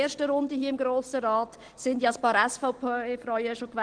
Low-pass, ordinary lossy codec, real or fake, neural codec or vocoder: 9.9 kHz; Opus, 16 kbps; real; none